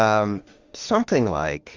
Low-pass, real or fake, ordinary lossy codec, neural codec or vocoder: 7.2 kHz; fake; Opus, 32 kbps; autoencoder, 48 kHz, 32 numbers a frame, DAC-VAE, trained on Japanese speech